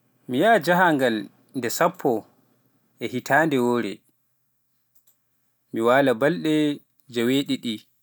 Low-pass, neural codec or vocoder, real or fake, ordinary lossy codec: none; none; real; none